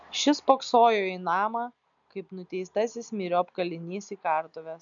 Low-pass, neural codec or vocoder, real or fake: 7.2 kHz; none; real